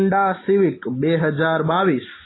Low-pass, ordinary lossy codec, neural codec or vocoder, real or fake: 7.2 kHz; AAC, 16 kbps; none; real